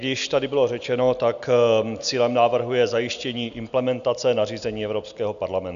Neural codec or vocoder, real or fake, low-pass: none; real; 7.2 kHz